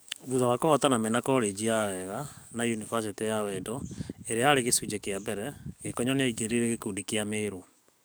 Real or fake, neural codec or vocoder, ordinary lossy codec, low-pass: fake; codec, 44.1 kHz, 7.8 kbps, DAC; none; none